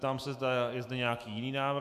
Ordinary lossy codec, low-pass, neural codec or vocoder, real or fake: AAC, 96 kbps; 14.4 kHz; autoencoder, 48 kHz, 128 numbers a frame, DAC-VAE, trained on Japanese speech; fake